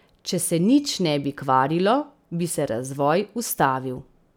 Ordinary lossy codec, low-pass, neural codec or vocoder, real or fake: none; none; none; real